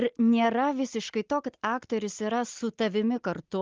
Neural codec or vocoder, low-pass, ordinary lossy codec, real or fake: none; 7.2 kHz; Opus, 32 kbps; real